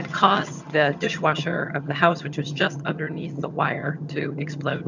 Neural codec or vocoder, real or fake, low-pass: vocoder, 22.05 kHz, 80 mel bands, HiFi-GAN; fake; 7.2 kHz